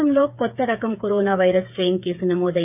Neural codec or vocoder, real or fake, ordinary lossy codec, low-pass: codec, 16 kHz, 8 kbps, FreqCodec, smaller model; fake; none; 3.6 kHz